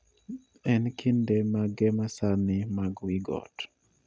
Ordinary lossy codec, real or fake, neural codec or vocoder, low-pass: none; real; none; none